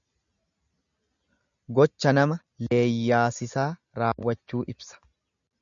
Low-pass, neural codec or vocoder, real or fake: 7.2 kHz; none; real